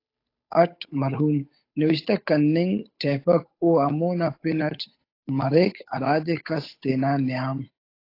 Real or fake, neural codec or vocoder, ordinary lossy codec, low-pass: fake; codec, 16 kHz, 8 kbps, FunCodec, trained on Chinese and English, 25 frames a second; AAC, 32 kbps; 5.4 kHz